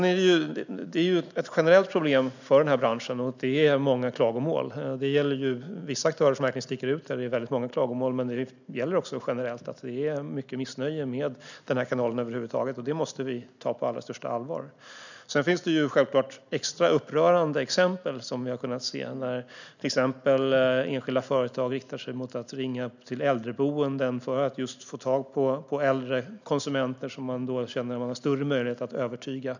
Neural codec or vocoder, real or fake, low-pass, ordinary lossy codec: none; real; 7.2 kHz; none